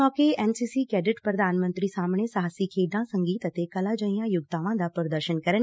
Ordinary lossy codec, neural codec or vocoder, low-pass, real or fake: none; none; none; real